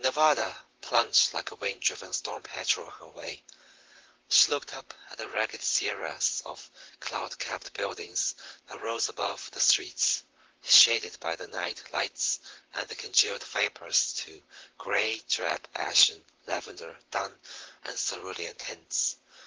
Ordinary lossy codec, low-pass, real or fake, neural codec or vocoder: Opus, 16 kbps; 7.2 kHz; fake; vocoder, 44.1 kHz, 128 mel bands, Pupu-Vocoder